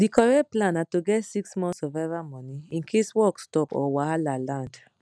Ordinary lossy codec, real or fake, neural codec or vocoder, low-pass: none; real; none; none